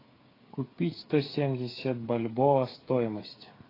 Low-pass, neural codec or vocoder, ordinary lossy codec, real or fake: 5.4 kHz; codec, 16 kHz, 8 kbps, FreqCodec, smaller model; AAC, 24 kbps; fake